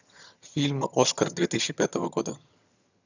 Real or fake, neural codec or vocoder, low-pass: fake; vocoder, 22.05 kHz, 80 mel bands, HiFi-GAN; 7.2 kHz